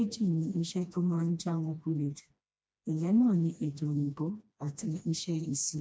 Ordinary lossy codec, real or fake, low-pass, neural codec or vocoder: none; fake; none; codec, 16 kHz, 1 kbps, FreqCodec, smaller model